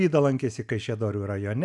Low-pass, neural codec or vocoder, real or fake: 10.8 kHz; none; real